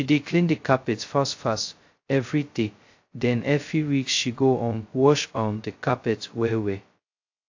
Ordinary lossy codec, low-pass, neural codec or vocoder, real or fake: AAC, 48 kbps; 7.2 kHz; codec, 16 kHz, 0.2 kbps, FocalCodec; fake